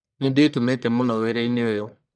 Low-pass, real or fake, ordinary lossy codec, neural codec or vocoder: 9.9 kHz; fake; none; codec, 44.1 kHz, 1.7 kbps, Pupu-Codec